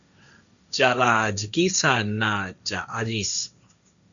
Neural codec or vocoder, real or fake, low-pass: codec, 16 kHz, 1.1 kbps, Voila-Tokenizer; fake; 7.2 kHz